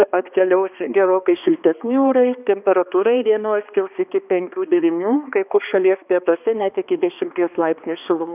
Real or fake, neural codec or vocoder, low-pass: fake; codec, 16 kHz, 2 kbps, X-Codec, HuBERT features, trained on balanced general audio; 3.6 kHz